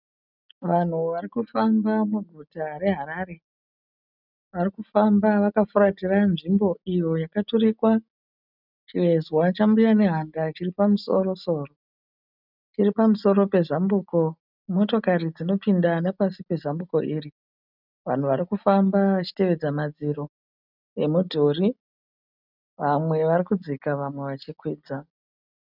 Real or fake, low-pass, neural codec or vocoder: real; 5.4 kHz; none